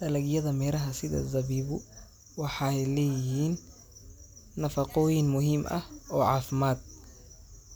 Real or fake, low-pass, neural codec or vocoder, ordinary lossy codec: real; none; none; none